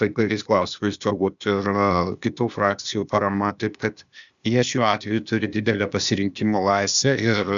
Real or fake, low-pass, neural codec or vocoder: fake; 7.2 kHz; codec, 16 kHz, 0.8 kbps, ZipCodec